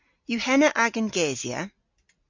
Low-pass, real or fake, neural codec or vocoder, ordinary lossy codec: 7.2 kHz; real; none; MP3, 48 kbps